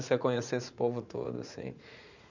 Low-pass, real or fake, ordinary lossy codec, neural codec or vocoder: 7.2 kHz; fake; none; vocoder, 44.1 kHz, 80 mel bands, Vocos